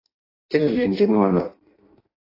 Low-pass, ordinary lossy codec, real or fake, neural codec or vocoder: 5.4 kHz; AAC, 24 kbps; fake; codec, 16 kHz in and 24 kHz out, 0.6 kbps, FireRedTTS-2 codec